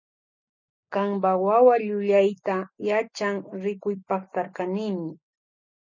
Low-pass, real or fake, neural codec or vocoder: 7.2 kHz; real; none